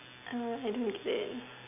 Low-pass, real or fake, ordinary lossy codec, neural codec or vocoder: 3.6 kHz; real; none; none